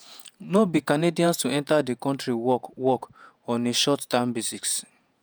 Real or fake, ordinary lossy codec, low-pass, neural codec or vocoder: fake; none; none; vocoder, 48 kHz, 128 mel bands, Vocos